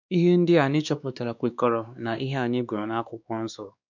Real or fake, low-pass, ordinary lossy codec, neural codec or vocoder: fake; 7.2 kHz; none; codec, 16 kHz, 2 kbps, X-Codec, WavLM features, trained on Multilingual LibriSpeech